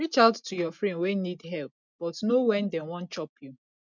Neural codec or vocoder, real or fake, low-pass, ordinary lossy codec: none; real; 7.2 kHz; none